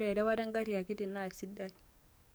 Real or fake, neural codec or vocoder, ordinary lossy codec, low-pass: fake; codec, 44.1 kHz, 7.8 kbps, DAC; none; none